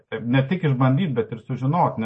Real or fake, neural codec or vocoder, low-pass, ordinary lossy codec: real; none; 10.8 kHz; MP3, 32 kbps